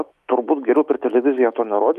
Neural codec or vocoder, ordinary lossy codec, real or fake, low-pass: autoencoder, 48 kHz, 128 numbers a frame, DAC-VAE, trained on Japanese speech; Opus, 32 kbps; fake; 14.4 kHz